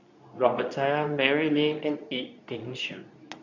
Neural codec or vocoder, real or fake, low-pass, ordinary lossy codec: codec, 24 kHz, 0.9 kbps, WavTokenizer, medium speech release version 2; fake; 7.2 kHz; none